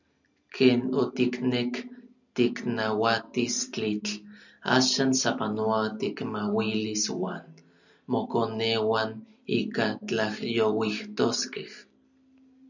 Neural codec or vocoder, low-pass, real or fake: none; 7.2 kHz; real